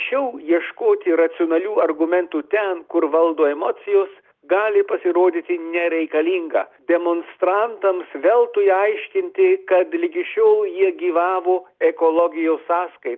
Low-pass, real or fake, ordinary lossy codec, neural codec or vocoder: 7.2 kHz; real; Opus, 32 kbps; none